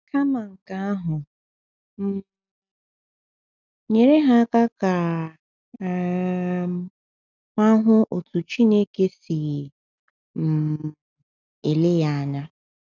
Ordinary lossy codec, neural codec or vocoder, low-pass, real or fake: none; none; 7.2 kHz; real